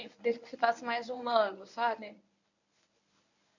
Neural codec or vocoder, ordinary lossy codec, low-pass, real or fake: codec, 24 kHz, 0.9 kbps, WavTokenizer, medium speech release version 1; none; 7.2 kHz; fake